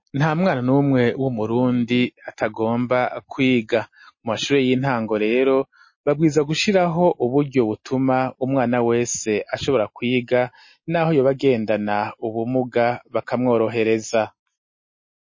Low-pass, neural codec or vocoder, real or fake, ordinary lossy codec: 7.2 kHz; none; real; MP3, 32 kbps